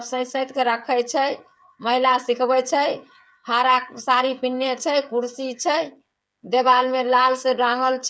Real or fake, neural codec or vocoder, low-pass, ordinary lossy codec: fake; codec, 16 kHz, 8 kbps, FreqCodec, smaller model; none; none